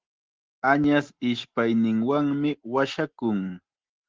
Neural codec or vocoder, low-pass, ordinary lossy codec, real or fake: none; 7.2 kHz; Opus, 16 kbps; real